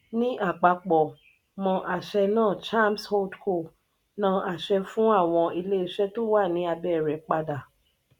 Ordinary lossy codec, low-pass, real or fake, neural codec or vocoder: none; 19.8 kHz; real; none